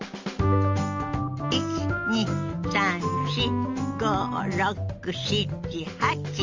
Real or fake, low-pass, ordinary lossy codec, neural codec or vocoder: real; 7.2 kHz; Opus, 32 kbps; none